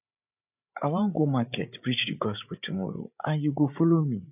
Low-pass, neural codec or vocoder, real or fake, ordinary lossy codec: 3.6 kHz; codec, 16 kHz, 8 kbps, FreqCodec, larger model; fake; none